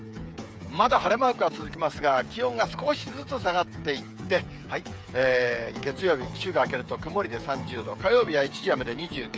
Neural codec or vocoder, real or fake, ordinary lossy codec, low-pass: codec, 16 kHz, 8 kbps, FreqCodec, smaller model; fake; none; none